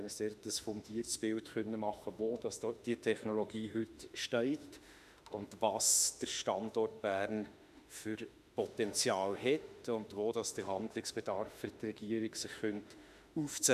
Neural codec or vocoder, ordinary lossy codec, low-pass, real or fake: autoencoder, 48 kHz, 32 numbers a frame, DAC-VAE, trained on Japanese speech; none; 14.4 kHz; fake